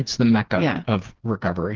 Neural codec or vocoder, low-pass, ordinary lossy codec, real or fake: codec, 16 kHz, 4 kbps, FreqCodec, smaller model; 7.2 kHz; Opus, 16 kbps; fake